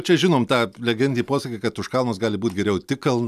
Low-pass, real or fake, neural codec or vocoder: 14.4 kHz; real; none